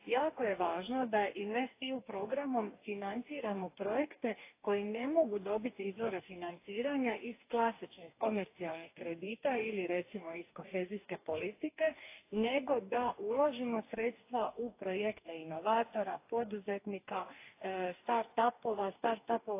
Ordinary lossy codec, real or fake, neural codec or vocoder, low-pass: AAC, 24 kbps; fake; codec, 44.1 kHz, 2.6 kbps, DAC; 3.6 kHz